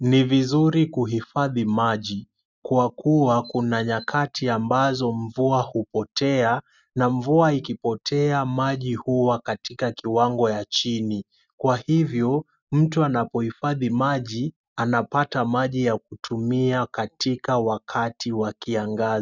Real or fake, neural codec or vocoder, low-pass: real; none; 7.2 kHz